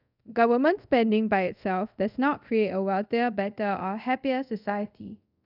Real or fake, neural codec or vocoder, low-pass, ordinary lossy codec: fake; codec, 24 kHz, 0.5 kbps, DualCodec; 5.4 kHz; none